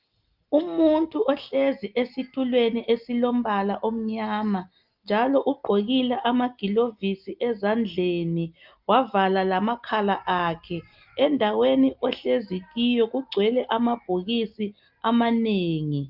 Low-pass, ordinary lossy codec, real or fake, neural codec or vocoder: 5.4 kHz; Opus, 24 kbps; real; none